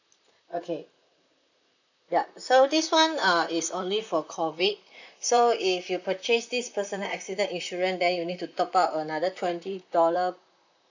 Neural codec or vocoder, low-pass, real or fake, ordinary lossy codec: vocoder, 44.1 kHz, 128 mel bands, Pupu-Vocoder; 7.2 kHz; fake; none